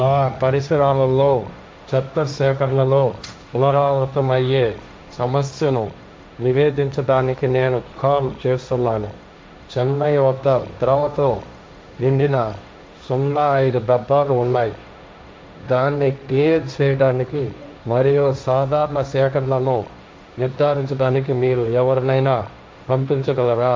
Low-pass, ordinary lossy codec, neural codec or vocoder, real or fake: none; none; codec, 16 kHz, 1.1 kbps, Voila-Tokenizer; fake